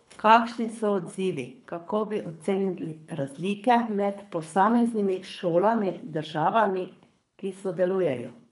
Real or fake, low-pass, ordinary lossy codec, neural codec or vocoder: fake; 10.8 kHz; none; codec, 24 kHz, 3 kbps, HILCodec